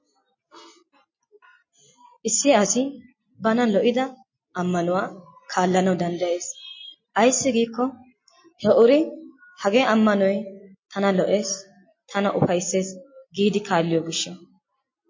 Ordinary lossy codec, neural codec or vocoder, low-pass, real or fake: MP3, 32 kbps; none; 7.2 kHz; real